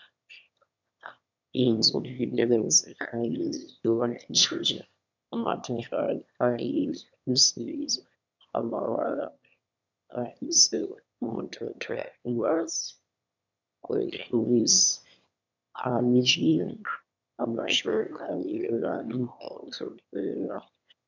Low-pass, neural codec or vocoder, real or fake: 7.2 kHz; autoencoder, 22.05 kHz, a latent of 192 numbers a frame, VITS, trained on one speaker; fake